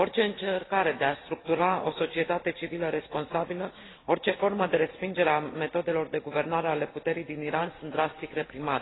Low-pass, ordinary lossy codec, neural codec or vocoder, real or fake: 7.2 kHz; AAC, 16 kbps; none; real